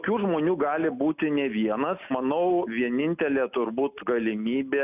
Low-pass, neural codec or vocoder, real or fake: 3.6 kHz; none; real